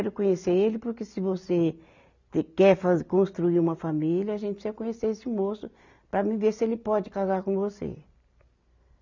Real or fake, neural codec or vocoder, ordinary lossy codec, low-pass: real; none; none; 7.2 kHz